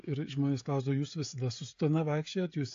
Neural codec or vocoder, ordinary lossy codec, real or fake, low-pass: codec, 16 kHz, 16 kbps, FreqCodec, smaller model; AAC, 48 kbps; fake; 7.2 kHz